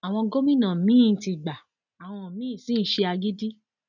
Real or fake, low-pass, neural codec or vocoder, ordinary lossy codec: real; 7.2 kHz; none; none